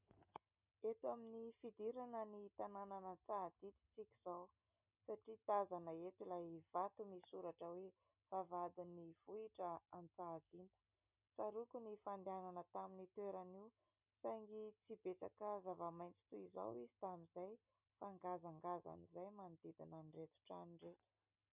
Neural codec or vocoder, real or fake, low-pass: none; real; 3.6 kHz